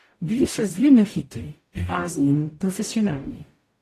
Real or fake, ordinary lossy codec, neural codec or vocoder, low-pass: fake; AAC, 48 kbps; codec, 44.1 kHz, 0.9 kbps, DAC; 14.4 kHz